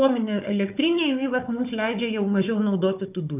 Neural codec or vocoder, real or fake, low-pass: codec, 16 kHz, 4 kbps, FunCodec, trained on Chinese and English, 50 frames a second; fake; 3.6 kHz